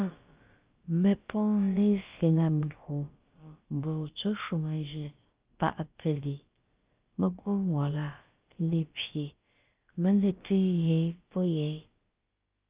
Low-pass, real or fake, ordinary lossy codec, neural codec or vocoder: 3.6 kHz; fake; Opus, 32 kbps; codec, 16 kHz, about 1 kbps, DyCAST, with the encoder's durations